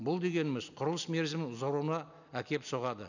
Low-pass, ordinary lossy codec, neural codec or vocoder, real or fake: 7.2 kHz; none; none; real